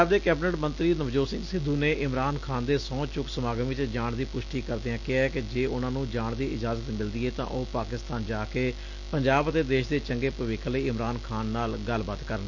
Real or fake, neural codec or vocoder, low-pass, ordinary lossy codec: real; none; 7.2 kHz; none